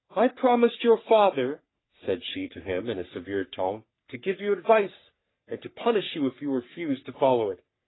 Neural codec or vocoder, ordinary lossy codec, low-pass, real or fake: codec, 44.1 kHz, 3.4 kbps, Pupu-Codec; AAC, 16 kbps; 7.2 kHz; fake